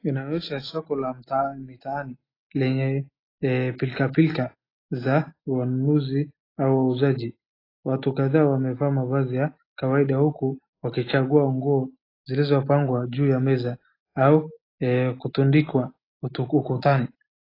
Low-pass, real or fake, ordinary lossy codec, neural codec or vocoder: 5.4 kHz; real; AAC, 24 kbps; none